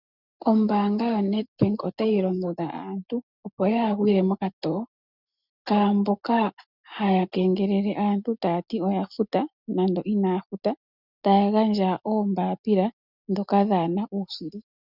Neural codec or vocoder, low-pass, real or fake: none; 5.4 kHz; real